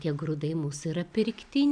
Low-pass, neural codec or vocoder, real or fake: 9.9 kHz; vocoder, 44.1 kHz, 128 mel bands every 256 samples, BigVGAN v2; fake